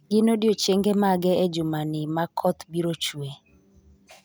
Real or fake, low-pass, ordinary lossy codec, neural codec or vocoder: real; none; none; none